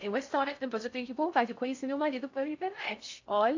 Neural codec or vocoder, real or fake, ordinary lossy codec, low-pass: codec, 16 kHz in and 24 kHz out, 0.6 kbps, FocalCodec, streaming, 4096 codes; fake; AAC, 48 kbps; 7.2 kHz